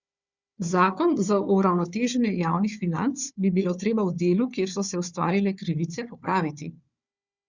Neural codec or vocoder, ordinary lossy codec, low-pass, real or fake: codec, 16 kHz, 4 kbps, FunCodec, trained on Chinese and English, 50 frames a second; Opus, 64 kbps; 7.2 kHz; fake